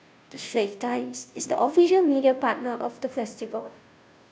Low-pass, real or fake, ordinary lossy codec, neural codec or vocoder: none; fake; none; codec, 16 kHz, 0.5 kbps, FunCodec, trained on Chinese and English, 25 frames a second